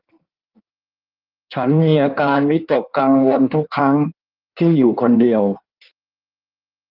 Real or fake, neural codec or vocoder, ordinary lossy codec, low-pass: fake; codec, 16 kHz in and 24 kHz out, 1.1 kbps, FireRedTTS-2 codec; Opus, 32 kbps; 5.4 kHz